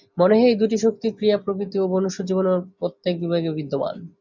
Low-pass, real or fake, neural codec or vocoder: 7.2 kHz; real; none